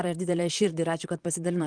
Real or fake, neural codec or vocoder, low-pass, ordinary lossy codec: fake; vocoder, 44.1 kHz, 128 mel bands, Pupu-Vocoder; 9.9 kHz; Opus, 24 kbps